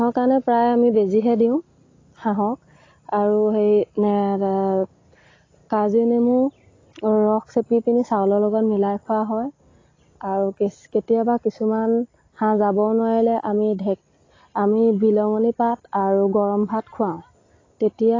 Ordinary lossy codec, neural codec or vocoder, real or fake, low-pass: AAC, 32 kbps; none; real; 7.2 kHz